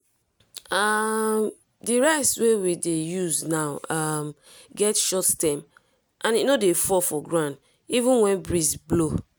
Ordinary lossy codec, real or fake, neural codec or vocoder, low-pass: none; real; none; none